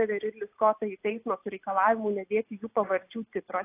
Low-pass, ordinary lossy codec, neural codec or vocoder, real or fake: 3.6 kHz; AAC, 24 kbps; none; real